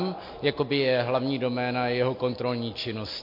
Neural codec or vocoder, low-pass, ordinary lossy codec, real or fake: none; 5.4 kHz; MP3, 32 kbps; real